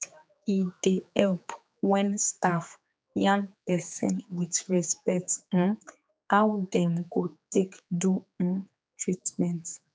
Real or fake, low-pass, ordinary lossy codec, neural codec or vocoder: fake; none; none; codec, 16 kHz, 4 kbps, X-Codec, HuBERT features, trained on general audio